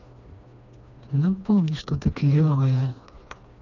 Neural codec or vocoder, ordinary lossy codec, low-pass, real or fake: codec, 16 kHz, 2 kbps, FreqCodec, smaller model; none; 7.2 kHz; fake